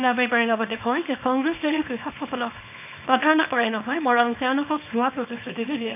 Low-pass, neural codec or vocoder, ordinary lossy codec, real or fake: 3.6 kHz; codec, 24 kHz, 0.9 kbps, WavTokenizer, small release; none; fake